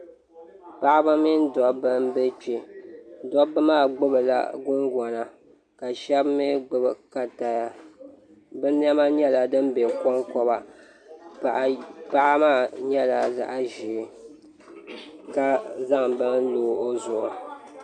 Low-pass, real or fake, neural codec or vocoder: 9.9 kHz; real; none